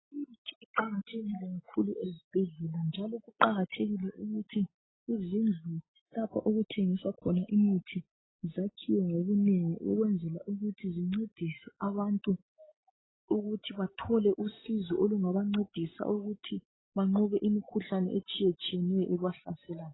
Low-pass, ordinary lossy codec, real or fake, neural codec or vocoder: 7.2 kHz; AAC, 16 kbps; real; none